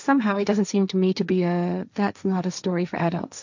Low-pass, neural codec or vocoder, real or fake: 7.2 kHz; codec, 16 kHz, 1.1 kbps, Voila-Tokenizer; fake